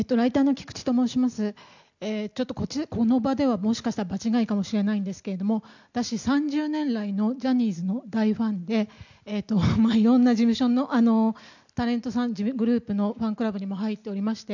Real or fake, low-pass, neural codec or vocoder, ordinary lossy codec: real; 7.2 kHz; none; none